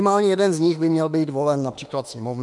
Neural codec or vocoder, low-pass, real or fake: autoencoder, 48 kHz, 32 numbers a frame, DAC-VAE, trained on Japanese speech; 10.8 kHz; fake